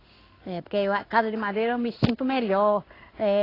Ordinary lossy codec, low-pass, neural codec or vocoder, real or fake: AAC, 24 kbps; 5.4 kHz; codec, 16 kHz in and 24 kHz out, 1 kbps, XY-Tokenizer; fake